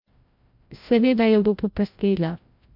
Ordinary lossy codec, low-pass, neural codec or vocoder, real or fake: MP3, 48 kbps; 5.4 kHz; codec, 16 kHz, 0.5 kbps, FreqCodec, larger model; fake